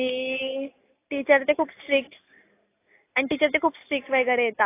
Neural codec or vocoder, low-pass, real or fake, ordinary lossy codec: none; 3.6 kHz; real; AAC, 24 kbps